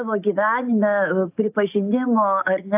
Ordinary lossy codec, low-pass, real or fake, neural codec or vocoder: AAC, 32 kbps; 3.6 kHz; real; none